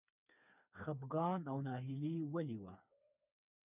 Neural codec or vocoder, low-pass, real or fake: codec, 16 kHz, 4 kbps, FreqCodec, smaller model; 3.6 kHz; fake